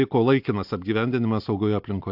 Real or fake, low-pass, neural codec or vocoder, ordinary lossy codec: real; 5.4 kHz; none; AAC, 48 kbps